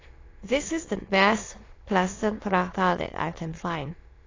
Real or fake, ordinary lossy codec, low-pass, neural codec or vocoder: fake; AAC, 32 kbps; 7.2 kHz; autoencoder, 22.05 kHz, a latent of 192 numbers a frame, VITS, trained on many speakers